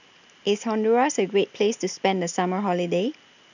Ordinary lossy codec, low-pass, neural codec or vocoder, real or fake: none; 7.2 kHz; none; real